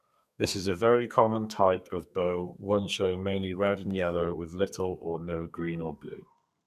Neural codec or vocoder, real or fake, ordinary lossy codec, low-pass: codec, 32 kHz, 1.9 kbps, SNAC; fake; none; 14.4 kHz